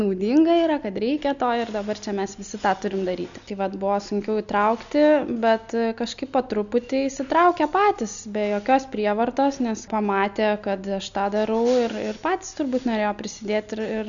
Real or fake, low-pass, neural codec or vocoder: real; 7.2 kHz; none